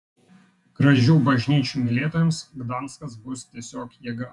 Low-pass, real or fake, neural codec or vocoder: 10.8 kHz; fake; vocoder, 48 kHz, 128 mel bands, Vocos